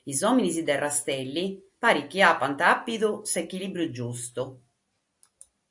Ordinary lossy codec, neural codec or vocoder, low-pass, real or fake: AAC, 64 kbps; none; 10.8 kHz; real